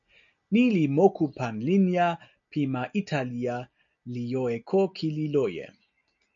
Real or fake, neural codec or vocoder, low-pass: real; none; 7.2 kHz